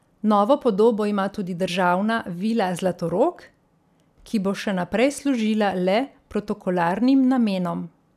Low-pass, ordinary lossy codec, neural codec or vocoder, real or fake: 14.4 kHz; none; none; real